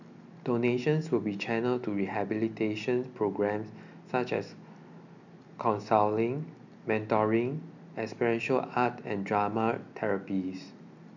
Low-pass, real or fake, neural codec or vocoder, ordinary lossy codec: 7.2 kHz; real; none; none